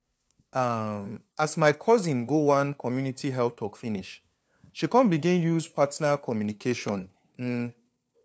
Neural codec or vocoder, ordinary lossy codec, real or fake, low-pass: codec, 16 kHz, 2 kbps, FunCodec, trained on LibriTTS, 25 frames a second; none; fake; none